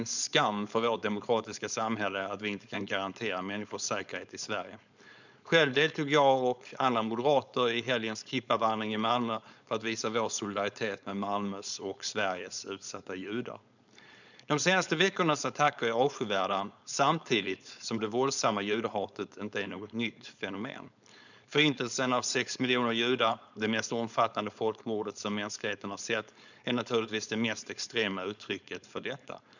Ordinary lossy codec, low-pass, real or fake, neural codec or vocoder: none; 7.2 kHz; fake; codec, 16 kHz, 4.8 kbps, FACodec